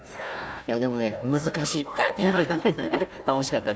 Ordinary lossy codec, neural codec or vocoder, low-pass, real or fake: none; codec, 16 kHz, 1 kbps, FunCodec, trained on Chinese and English, 50 frames a second; none; fake